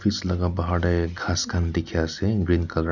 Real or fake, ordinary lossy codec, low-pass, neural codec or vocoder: real; none; 7.2 kHz; none